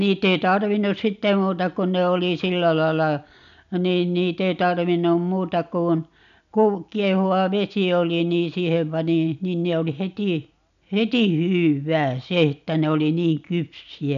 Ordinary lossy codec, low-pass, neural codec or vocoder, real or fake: none; 7.2 kHz; none; real